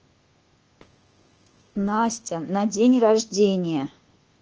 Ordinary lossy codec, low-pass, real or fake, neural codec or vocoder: Opus, 16 kbps; 7.2 kHz; fake; codec, 24 kHz, 1.2 kbps, DualCodec